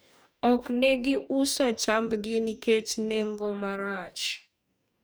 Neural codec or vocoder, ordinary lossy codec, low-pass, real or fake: codec, 44.1 kHz, 2.6 kbps, DAC; none; none; fake